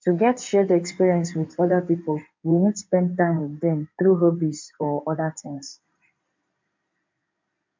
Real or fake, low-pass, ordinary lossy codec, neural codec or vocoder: fake; 7.2 kHz; MP3, 64 kbps; codec, 16 kHz in and 24 kHz out, 2.2 kbps, FireRedTTS-2 codec